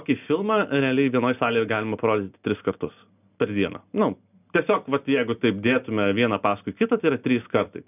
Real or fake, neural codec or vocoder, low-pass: real; none; 3.6 kHz